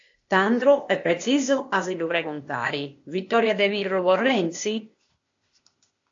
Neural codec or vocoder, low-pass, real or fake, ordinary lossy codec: codec, 16 kHz, 0.8 kbps, ZipCodec; 7.2 kHz; fake; AAC, 48 kbps